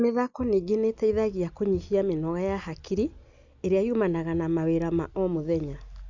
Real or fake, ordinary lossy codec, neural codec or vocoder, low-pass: real; Opus, 64 kbps; none; 7.2 kHz